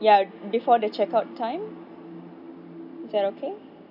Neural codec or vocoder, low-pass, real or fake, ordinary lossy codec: none; 5.4 kHz; real; none